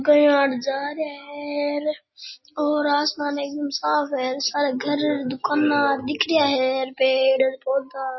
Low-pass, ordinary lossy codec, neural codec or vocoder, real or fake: 7.2 kHz; MP3, 24 kbps; none; real